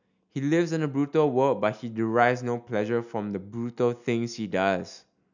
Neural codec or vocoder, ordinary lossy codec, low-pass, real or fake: none; none; 7.2 kHz; real